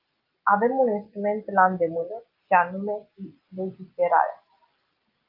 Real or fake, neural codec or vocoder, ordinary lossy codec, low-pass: real; none; Opus, 24 kbps; 5.4 kHz